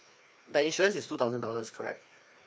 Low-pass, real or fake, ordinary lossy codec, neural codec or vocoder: none; fake; none; codec, 16 kHz, 2 kbps, FreqCodec, larger model